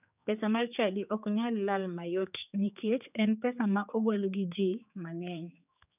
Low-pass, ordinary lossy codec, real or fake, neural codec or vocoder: 3.6 kHz; none; fake; codec, 16 kHz, 4 kbps, X-Codec, HuBERT features, trained on general audio